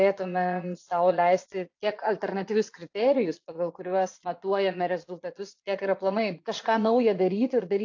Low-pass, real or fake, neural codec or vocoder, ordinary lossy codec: 7.2 kHz; fake; vocoder, 22.05 kHz, 80 mel bands, Vocos; AAC, 48 kbps